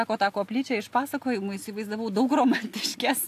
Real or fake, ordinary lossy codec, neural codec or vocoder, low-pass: real; AAC, 64 kbps; none; 14.4 kHz